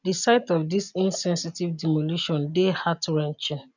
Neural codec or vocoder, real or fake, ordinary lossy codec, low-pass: none; real; none; 7.2 kHz